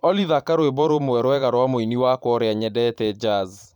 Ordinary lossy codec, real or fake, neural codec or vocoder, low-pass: none; fake; vocoder, 44.1 kHz, 128 mel bands every 512 samples, BigVGAN v2; 19.8 kHz